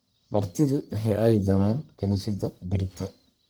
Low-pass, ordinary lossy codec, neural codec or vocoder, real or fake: none; none; codec, 44.1 kHz, 1.7 kbps, Pupu-Codec; fake